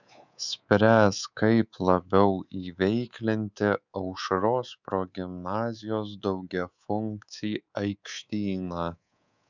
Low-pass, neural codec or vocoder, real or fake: 7.2 kHz; codec, 24 kHz, 3.1 kbps, DualCodec; fake